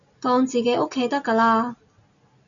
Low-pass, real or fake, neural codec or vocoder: 7.2 kHz; real; none